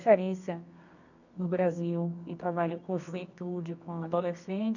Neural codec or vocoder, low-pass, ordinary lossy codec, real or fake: codec, 24 kHz, 0.9 kbps, WavTokenizer, medium music audio release; 7.2 kHz; none; fake